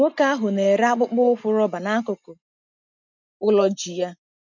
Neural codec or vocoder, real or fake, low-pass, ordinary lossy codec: none; real; 7.2 kHz; none